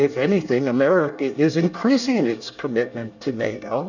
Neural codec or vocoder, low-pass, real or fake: codec, 24 kHz, 1 kbps, SNAC; 7.2 kHz; fake